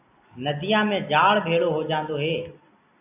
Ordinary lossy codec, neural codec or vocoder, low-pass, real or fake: AAC, 24 kbps; none; 3.6 kHz; real